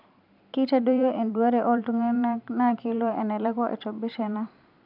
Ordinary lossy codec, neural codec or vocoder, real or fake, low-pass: none; vocoder, 44.1 kHz, 128 mel bands every 512 samples, BigVGAN v2; fake; 5.4 kHz